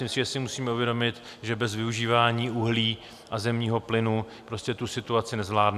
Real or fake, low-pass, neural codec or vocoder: real; 14.4 kHz; none